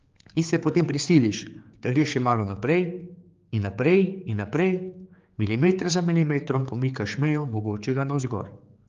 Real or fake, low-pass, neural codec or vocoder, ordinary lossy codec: fake; 7.2 kHz; codec, 16 kHz, 4 kbps, X-Codec, HuBERT features, trained on general audio; Opus, 32 kbps